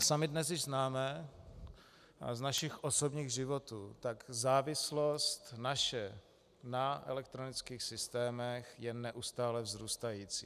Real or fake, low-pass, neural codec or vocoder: real; 14.4 kHz; none